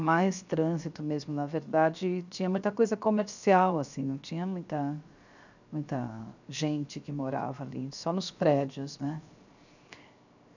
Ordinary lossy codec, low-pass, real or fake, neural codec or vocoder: none; 7.2 kHz; fake; codec, 16 kHz, 0.7 kbps, FocalCodec